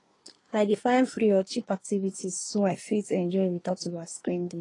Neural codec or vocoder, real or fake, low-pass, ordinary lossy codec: codec, 24 kHz, 1 kbps, SNAC; fake; 10.8 kHz; AAC, 32 kbps